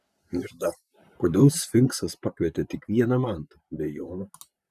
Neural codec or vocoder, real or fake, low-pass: vocoder, 44.1 kHz, 128 mel bands, Pupu-Vocoder; fake; 14.4 kHz